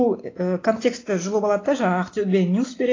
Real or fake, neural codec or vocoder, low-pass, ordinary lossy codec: real; none; 7.2 kHz; AAC, 32 kbps